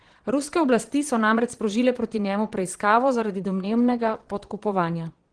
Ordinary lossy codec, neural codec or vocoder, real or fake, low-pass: Opus, 16 kbps; vocoder, 22.05 kHz, 80 mel bands, Vocos; fake; 9.9 kHz